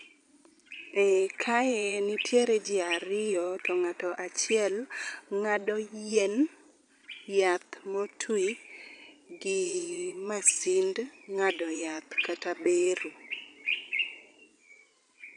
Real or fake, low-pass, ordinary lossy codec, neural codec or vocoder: fake; 9.9 kHz; none; vocoder, 22.05 kHz, 80 mel bands, Vocos